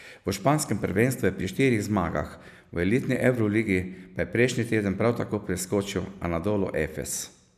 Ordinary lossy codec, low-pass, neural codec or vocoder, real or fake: none; 14.4 kHz; none; real